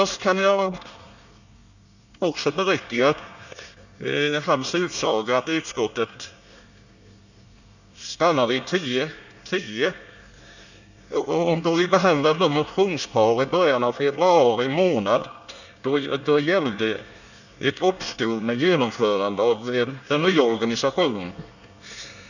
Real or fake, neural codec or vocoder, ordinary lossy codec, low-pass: fake; codec, 24 kHz, 1 kbps, SNAC; none; 7.2 kHz